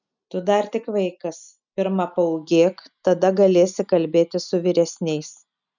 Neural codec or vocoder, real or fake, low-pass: none; real; 7.2 kHz